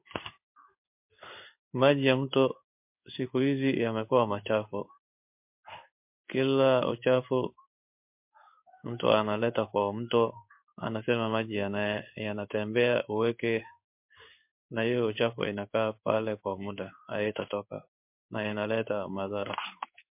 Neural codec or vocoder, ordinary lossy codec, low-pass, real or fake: codec, 16 kHz in and 24 kHz out, 1 kbps, XY-Tokenizer; MP3, 32 kbps; 3.6 kHz; fake